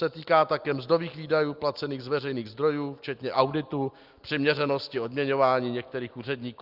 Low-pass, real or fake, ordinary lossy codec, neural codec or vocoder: 5.4 kHz; real; Opus, 32 kbps; none